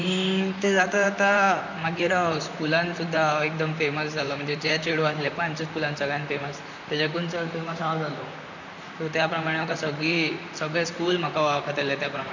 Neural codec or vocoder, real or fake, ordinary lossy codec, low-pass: vocoder, 44.1 kHz, 128 mel bands, Pupu-Vocoder; fake; none; 7.2 kHz